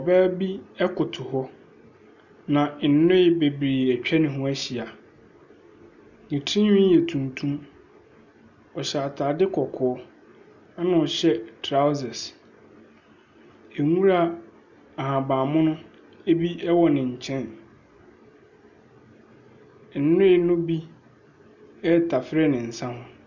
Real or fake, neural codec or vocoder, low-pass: real; none; 7.2 kHz